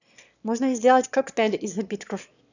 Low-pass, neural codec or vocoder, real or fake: 7.2 kHz; autoencoder, 22.05 kHz, a latent of 192 numbers a frame, VITS, trained on one speaker; fake